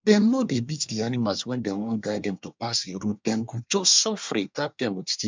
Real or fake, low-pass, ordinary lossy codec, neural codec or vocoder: fake; 7.2 kHz; MP3, 64 kbps; codec, 24 kHz, 1 kbps, SNAC